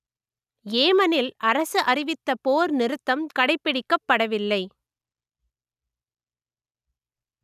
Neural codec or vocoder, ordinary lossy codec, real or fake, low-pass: none; none; real; 14.4 kHz